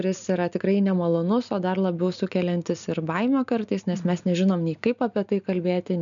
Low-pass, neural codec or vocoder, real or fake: 7.2 kHz; none; real